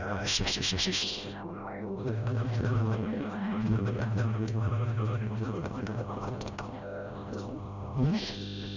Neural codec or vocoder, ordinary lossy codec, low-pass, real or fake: codec, 16 kHz, 0.5 kbps, FreqCodec, smaller model; none; 7.2 kHz; fake